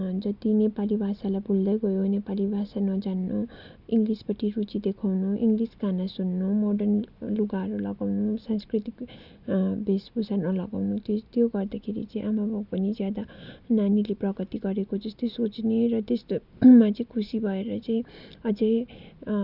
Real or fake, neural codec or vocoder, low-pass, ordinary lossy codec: real; none; 5.4 kHz; none